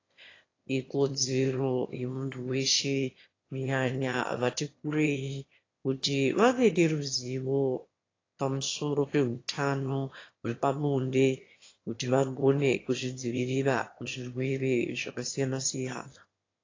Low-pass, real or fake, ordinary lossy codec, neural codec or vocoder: 7.2 kHz; fake; AAC, 32 kbps; autoencoder, 22.05 kHz, a latent of 192 numbers a frame, VITS, trained on one speaker